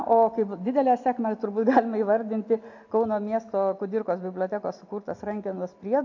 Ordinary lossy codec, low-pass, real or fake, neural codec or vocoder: AAC, 48 kbps; 7.2 kHz; real; none